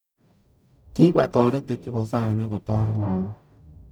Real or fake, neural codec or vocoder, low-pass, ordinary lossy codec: fake; codec, 44.1 kHz, 0.9 kbps, DAC; none; none